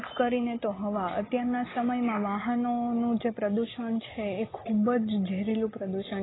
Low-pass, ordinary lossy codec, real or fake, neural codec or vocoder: 7.2 kHz; AAC, 16 kbps; real; none